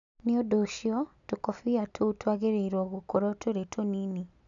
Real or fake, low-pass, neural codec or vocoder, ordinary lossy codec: real; 7.2 kHz; none; none